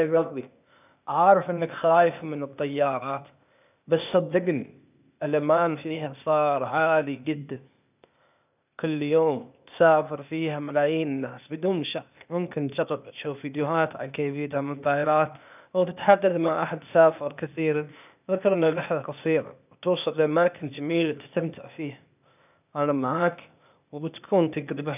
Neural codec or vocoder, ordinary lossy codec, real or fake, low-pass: codec, 16 kHz, 0.8 kbps, ZipCodec; none; fake; 3.6 kHz